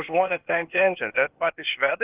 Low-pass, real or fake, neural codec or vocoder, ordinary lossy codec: 3.6 kHz; fake; codec, 16 kHz, 0.8 kbps, ZipCodec; Opus, 32 kbps